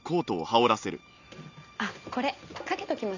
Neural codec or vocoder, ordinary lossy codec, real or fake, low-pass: none; none; real; 7.2 kHz